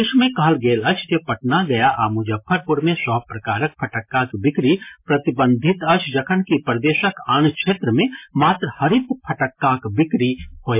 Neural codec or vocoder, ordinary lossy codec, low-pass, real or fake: none; MP3, 24 kbps; 3.6 kHz; real